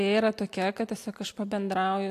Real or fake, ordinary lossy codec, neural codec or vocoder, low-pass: real; AAC, 64 kbps; none; 14.4 kHz